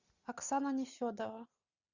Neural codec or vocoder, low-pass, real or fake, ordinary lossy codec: none; 7.2 kHz; real; Opus, 64 kbps